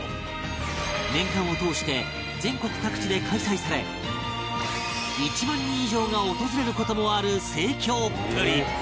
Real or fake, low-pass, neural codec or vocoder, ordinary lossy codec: real; none; none; none